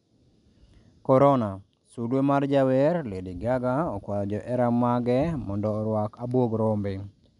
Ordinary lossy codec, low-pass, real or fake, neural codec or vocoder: none; 10.8 kHz; real; none